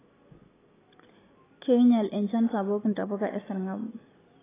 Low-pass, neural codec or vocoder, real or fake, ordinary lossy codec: 3.6 kHz; none; real; AAC, 16 kbps